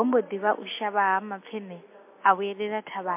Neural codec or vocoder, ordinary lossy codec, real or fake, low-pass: none; MP3, 24 kbps; real; 3.6 kHz